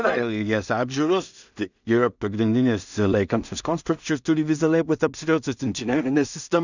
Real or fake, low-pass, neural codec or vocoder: fake; 7.2 kHz; codec, 16 kHz in and 24 kHz out, 0.4 kbps, LongCat-Audio-Codec, two codebook decoder